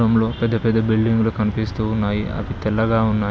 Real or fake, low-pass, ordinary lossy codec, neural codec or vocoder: real; none; none; none